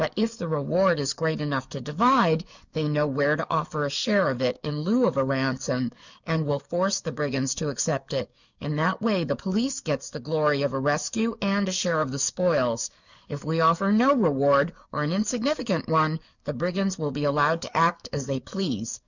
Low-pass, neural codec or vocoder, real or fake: 7.2 kHz; codec, 44.1 kHz, 7.8 kbps, DAC; fake